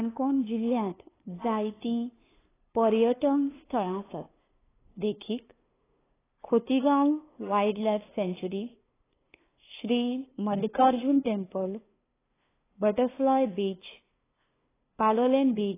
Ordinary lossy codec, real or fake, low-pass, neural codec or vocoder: AAC, 16 kbps; fake; 3.6 kHz; codec, 16 kHz, 2 kbps, FunCodec, trained on LibriTTS, 25 frames a second